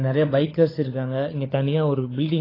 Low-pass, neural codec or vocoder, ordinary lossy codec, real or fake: 5.4 kHz; codec, 16 kHz, 16 kbps, FunCodec, trained on LibriTTS, 50 frames a second; AAC, 24 kbps; fake